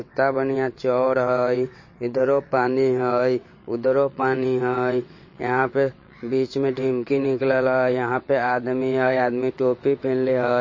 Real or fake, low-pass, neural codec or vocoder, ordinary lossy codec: fake; 7.2 kHz; vocoder, 22.05 kHz, 80 mel bands, WaveNeXt; MP3, 32 kbps